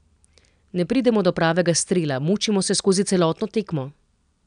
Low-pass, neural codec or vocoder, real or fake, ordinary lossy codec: 9.9 kHz; none; real; none